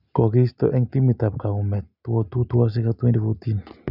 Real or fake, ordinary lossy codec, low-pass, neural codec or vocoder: real; none; 5.4 kHz; none